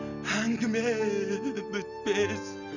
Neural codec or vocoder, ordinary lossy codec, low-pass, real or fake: none; none; 7.2 kHz; real